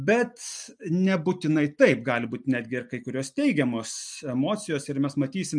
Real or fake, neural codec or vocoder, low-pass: real; none; 9.9 kHz